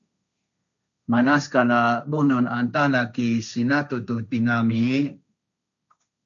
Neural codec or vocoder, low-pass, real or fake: codec, 16 kHz, 1.1 kbps, Voila-Tokenizer; 7.2 kHz; fake